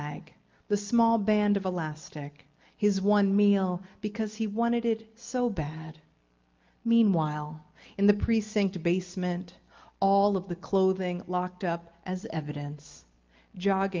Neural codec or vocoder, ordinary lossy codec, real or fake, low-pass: none; Opus, 32 kbps; real; 7.2 kHz